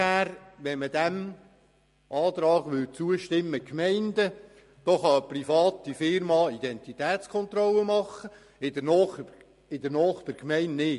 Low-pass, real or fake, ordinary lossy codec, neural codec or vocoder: 14.4 kHz; real; MP3, 48 kbps; none